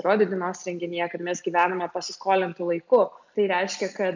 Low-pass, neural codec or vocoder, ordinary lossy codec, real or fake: 7.2 kHz; none; MP3, 64 kbps; real